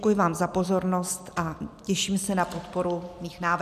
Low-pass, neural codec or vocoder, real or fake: 14.4 kHz; none; real